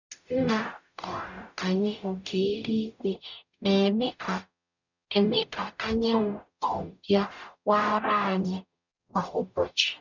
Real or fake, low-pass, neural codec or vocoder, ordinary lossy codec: fake; 7.2 kHz; codec, 44.1 kHz, 0.9 kbps, DAC; none